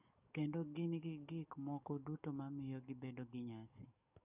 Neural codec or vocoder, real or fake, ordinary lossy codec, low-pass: codec, 44.1 kHz, 7.8 kbps, DAC; fake; none; 3.6 kHz